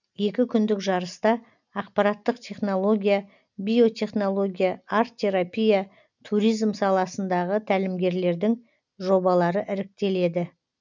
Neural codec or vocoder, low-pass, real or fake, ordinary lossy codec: none; 7.2 kHz; real; none